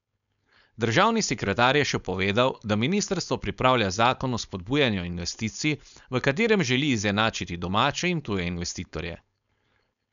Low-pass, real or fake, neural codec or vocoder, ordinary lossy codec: 7.2 kHz; fake; codec, 16 kHz, 4.8 kbps, FACodec; none